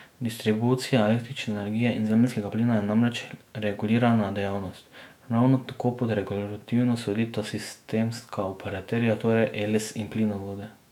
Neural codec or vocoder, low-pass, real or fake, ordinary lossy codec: autoencoder, 48 kHz, 128 numbers a frame, DAC-VAE, trained on Japanese speech; 19.8 kHz; fake; MP3, 96 kbps